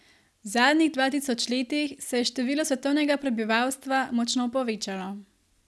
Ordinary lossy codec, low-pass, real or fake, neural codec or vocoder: none; none; real; none